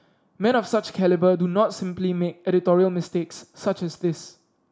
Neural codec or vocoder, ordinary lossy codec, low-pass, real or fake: none; none; none; real